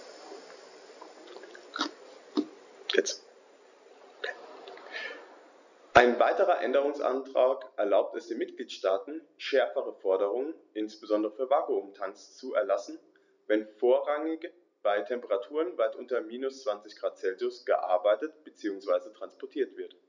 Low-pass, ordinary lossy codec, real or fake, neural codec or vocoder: 7.2 kHz; none; real; none